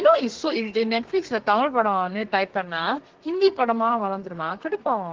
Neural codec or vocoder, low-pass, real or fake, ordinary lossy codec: codec, 32 kHz, 1.9 kbps, SNAC; 7.2 kHz; fake; Opus, 16 kbps